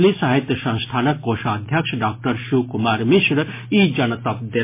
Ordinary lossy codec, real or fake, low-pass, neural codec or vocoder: MP3, 24 kbps; real; 3.6 kHz; none